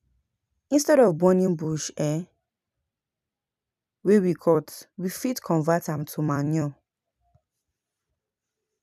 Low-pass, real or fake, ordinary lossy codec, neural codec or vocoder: 14.4 kHz; fake; none; vocoder, 44.1 kHz, 128 mel bands every 256 samples, BigVGAN v2